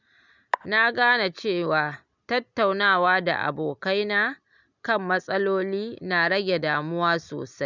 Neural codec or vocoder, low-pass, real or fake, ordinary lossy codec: none; 7.2 kHz; real; none